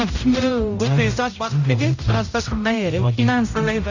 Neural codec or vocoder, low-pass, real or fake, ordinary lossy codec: codec, 16 kHz, 0.5 kbps, X-Codec, HuBERT features, trained on general audio; 7.2 kHz; fake; none